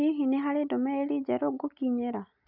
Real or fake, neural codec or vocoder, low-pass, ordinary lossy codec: real; none; 5.4 kHz; none